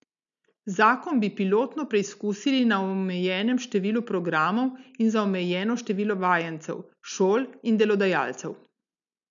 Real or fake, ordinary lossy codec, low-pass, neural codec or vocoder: real; none; 7.2 kHz; none